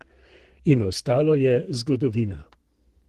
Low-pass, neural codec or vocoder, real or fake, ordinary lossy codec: 14.4 kHz; codec, 32 kHz, 1.9 kbps, SNAC; fake; Opus, 16 kbps